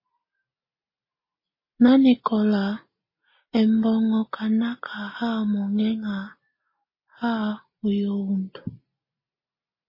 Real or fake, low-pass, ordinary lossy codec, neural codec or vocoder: real; 5.4 kHz; MP3, 24 kbps; none